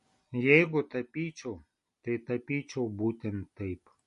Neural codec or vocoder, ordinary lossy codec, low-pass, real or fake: none; MP3, 48 kbps; 10.8 kHz; real